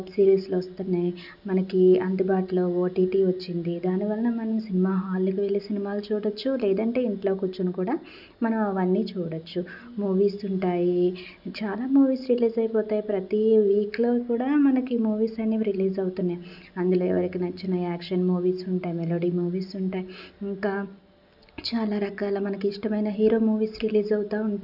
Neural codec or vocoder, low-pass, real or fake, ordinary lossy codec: none; 5.4 kHz; real; none